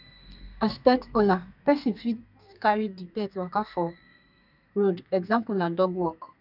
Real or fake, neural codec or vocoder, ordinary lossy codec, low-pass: fake; codec, 32 kHz, 1.9 kbps, SNAC; none; 5.4 kHz